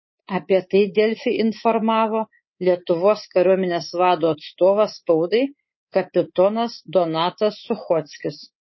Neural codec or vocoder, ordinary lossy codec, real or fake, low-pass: codec, 24 kHz, 3.1 kbps, DualCodec; MP3, 24 kbps; fake; 7.2 kHz